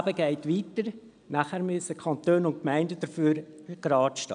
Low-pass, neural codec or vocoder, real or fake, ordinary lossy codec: 9.9 kHz; none; real; none